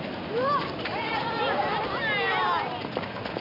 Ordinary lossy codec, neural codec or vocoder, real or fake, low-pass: none; none; real; 5.4 kHz